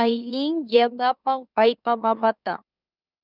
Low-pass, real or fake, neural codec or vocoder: 5.4 kHz; fake; autoencoder, 44.1 kHz, a latent of 192 numbers a frame, MeloTTS